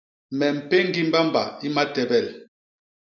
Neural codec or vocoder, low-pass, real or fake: none; 7.2 kHz; real